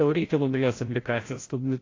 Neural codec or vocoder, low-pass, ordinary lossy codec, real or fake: codec, 16 kHz, 0.5 kbps, FreqCodec, larger model; 7.2 kHz; AAC, 32 kbps; fake